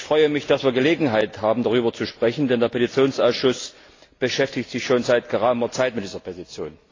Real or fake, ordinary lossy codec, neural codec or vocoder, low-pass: real; AAC, 32 kbps; none; 7.2 kHz